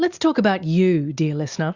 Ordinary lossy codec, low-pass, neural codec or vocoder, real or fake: Opus, 64 kbps; 7.2 kHz; none; real